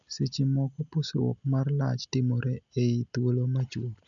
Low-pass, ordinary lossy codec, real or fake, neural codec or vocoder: 7.2 kHz; none; real; none